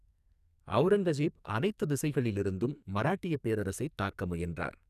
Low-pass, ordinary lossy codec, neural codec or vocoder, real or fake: 14.4 kHz; none; codec, 44.1 kHz, 2.6 kbps, SNAC; fake